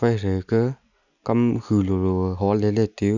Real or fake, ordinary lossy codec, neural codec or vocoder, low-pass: real; none; none; 7.2 kHz